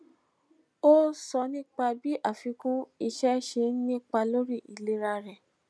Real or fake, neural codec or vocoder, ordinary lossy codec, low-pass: real; none; none; none